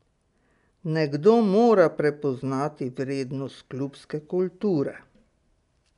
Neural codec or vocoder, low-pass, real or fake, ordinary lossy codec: none; 10.8 kHz; real; none